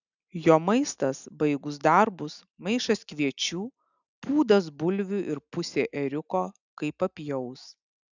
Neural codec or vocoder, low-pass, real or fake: none; 7.2 kHz; real